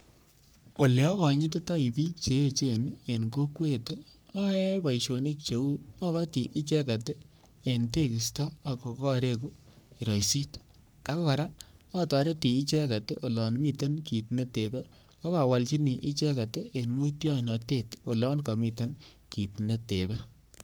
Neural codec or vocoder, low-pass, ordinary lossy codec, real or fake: codec, 44.1 kHz, 3.4 kbps, Pupu-Codec; none; none; fake